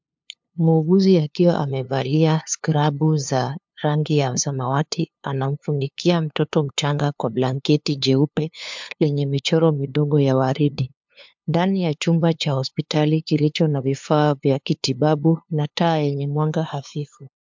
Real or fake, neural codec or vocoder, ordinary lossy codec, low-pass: fake; codec, 16 kHz, 2 kbps, FunCodec, trained on LibriTTS, 25 frames a second; MP3, 64 kbps; 7.2 kHz